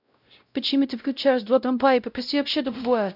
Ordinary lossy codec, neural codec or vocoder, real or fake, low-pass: none; codec, 16 kHz, 0.5 kbps, X-Codec, WavLM features, trained on Multilingual LibriSpeech; fake; 5.4 kHz